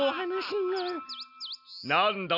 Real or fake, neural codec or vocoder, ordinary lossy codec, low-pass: real; none; none; 5.4 kHz